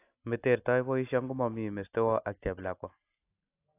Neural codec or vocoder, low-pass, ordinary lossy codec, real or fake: none; 3.6 kHz; AAC, 24 kbps; real